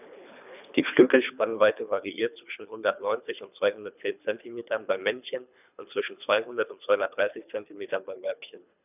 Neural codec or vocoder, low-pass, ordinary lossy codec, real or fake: codec, 24 kHz, 3 kbps, HILCodec; 3.6 kHz; none; fake